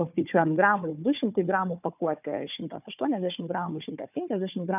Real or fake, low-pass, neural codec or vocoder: fake; 3.6 kHz; codec, 16 kHz, 4 kbps, FunCodec, trained on Chinese and English, 50 frames a second